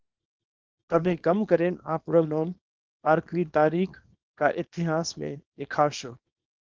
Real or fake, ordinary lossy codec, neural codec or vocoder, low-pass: fake; Opus, 16 kbps; codec, 24 kHz, 0.9 kbps, WavTokenizer, small release; 7.2 kHz